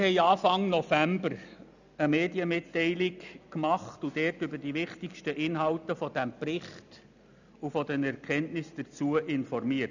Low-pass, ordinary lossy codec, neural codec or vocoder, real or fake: 7.2 kHz; none; none; real